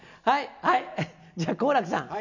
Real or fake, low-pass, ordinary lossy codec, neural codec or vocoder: real; 7.2 kHz; none; none